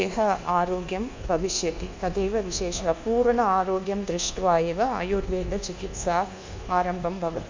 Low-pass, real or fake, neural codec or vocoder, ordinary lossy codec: 7.2 kHz; fake; codec, 24 kHz, 1.2 kbps, DualCodec; MP3, 64 kbps